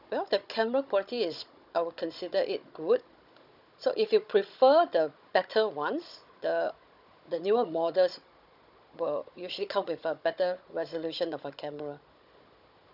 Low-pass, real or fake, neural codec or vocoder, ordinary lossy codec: 5.4 kHz; fake; codec, 16 kHz, 16 kbps, FunCodec, trained on Chinese and English, 50 frames a second; none